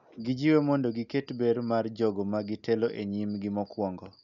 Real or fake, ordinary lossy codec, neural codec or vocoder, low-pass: real; none; none; 7.2 kHz